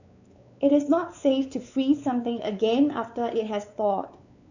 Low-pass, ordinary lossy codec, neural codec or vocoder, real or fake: 7.2 kHz; none; codec, 16 kHz, 4 kbps, X-Codec, WavLM features, trained on Multilingual LibriSpeech; fake